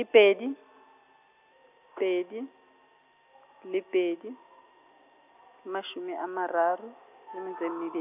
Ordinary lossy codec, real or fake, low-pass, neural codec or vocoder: none; real; 3.6 kHz; none